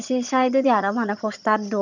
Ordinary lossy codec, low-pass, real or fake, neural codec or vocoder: none; 7.2 kHz; fake; vocoder, 22.05 kHz, 80 mel bands, HiFi-GAN